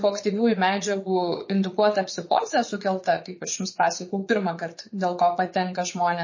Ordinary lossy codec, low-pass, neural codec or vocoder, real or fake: MP3, 32 kbps; 7.2 kHz; vocoder, 22.05 kHz, 80 mel bands, Vocos; fake